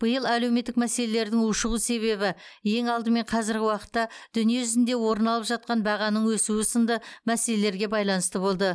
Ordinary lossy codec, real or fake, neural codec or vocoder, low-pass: none; real; none; none